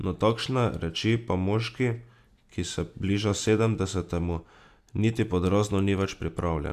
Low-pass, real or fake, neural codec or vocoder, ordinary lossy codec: 14.4 kHz; real; none; AAC, 96 kbps